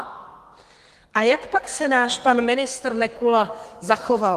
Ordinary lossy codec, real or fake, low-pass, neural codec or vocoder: Opus, 24 kbps; fake; 14.4 kHz; codec, 32 kHz, 1.9 kbps, SNAC